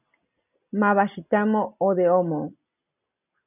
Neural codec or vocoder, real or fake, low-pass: none; real; 3.6 kHz